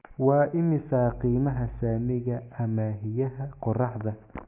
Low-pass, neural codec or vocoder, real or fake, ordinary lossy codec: 3.6 kHz; none; real; none